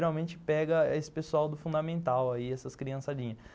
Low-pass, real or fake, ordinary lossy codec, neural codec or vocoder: none; real; none; none